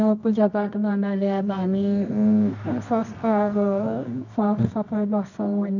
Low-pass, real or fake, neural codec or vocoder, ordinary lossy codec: 7.2 kHz; fake; codec, 24 kHz, 0.9 kbps, WavTokenizer, medium music audio release; none